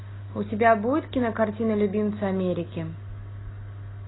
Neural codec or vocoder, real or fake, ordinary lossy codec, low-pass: none; real; AAC, 16 kbps; 7.2 kHz